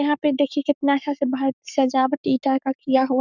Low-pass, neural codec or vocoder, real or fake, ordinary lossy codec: 7.2 kHz; codec, 44.1 kHz, 7.8 kbps, Pupu-Codec; fake; none